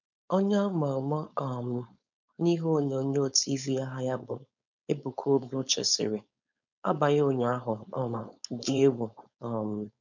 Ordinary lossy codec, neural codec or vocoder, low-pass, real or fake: none; codec, 16 kHz, 4.8 kbps, FACodec; 7.2 kHz; fake